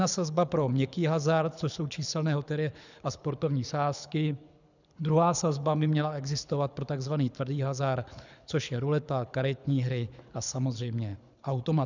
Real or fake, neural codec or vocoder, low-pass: fake; codec, 24 kHz, 6 kbps, HILCodec; 7.2 kHz